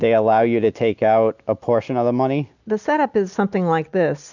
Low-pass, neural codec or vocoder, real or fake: 7.2 kHz; none; real